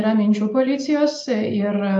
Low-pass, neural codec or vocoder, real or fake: 10.8 kHz; none; real